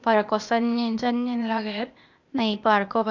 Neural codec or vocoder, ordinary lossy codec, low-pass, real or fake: codec, 16 kHz, 0.8 kbps, ZipCodec; Opus, 64 kbps; 7.2 kHz; fake